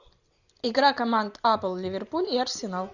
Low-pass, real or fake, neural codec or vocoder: 7.2 kHz; real; none